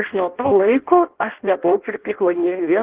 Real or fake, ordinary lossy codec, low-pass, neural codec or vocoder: fake; Opus, 16 kbps; 3.6 kHz; codec, 16 kHz in and 24 kHz out, 0.6 kbps, FireRedTTS-2 codec